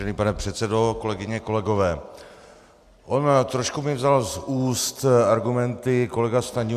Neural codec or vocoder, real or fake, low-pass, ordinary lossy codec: none; real; 14.4 kHz; Opus, 64 kbps